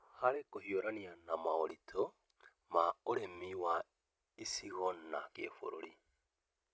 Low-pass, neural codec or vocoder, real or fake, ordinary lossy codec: none; none; real; none